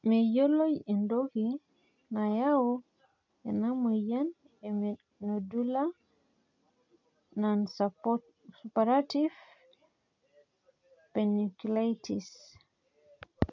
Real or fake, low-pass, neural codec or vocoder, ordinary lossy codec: real; 7.2 kHz; none; none